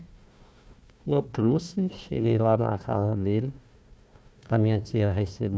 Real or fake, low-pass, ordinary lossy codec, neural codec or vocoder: fake; none; none; codec, 16 kHz, 1 kbps, FunCodec, trained on Chinese and English, 50 frames a second